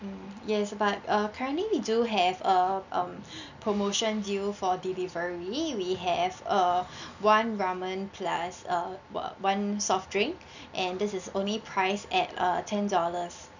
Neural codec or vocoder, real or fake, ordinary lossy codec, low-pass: none; real; none; 7.2 kHz